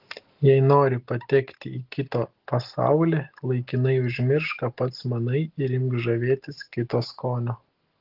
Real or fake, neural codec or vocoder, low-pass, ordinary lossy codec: real; none; 5.4 kHz; Opus, 16 kbps